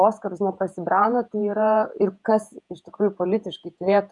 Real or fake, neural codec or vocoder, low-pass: fake; vocoder, 48 kHz, 128 mel bands, Vocos; 10.8 kHz